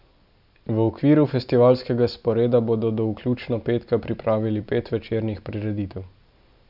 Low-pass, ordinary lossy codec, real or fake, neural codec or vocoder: 5.4 kHz; none; real; none